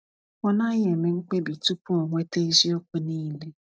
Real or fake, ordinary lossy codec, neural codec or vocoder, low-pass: real; none; none; none